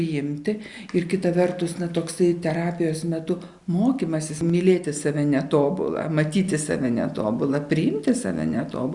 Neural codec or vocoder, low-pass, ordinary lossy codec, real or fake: none; 10.8 kHz; Opus, 64 kbps; real